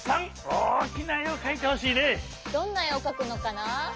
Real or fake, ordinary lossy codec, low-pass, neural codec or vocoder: real; none; none; none